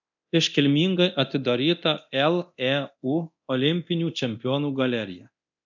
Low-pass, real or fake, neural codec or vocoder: 7.2 kHz; fake; codec, 24 kHz, 0.9 kbps, DualCodec